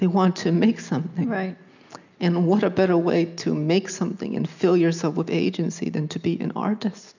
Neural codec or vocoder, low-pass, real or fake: none; 7.2 kHz; real